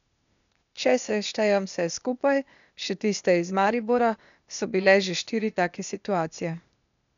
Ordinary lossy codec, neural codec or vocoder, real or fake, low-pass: none; codec, 16 kHz, 0.8 kbps, ZipCodec; fake; 7.2 kHz